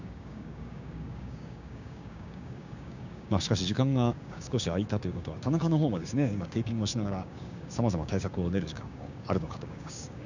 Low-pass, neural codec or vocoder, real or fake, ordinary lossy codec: 7.2 kHz; codec, 16 kHz, 6 kbps, DAC; fake; none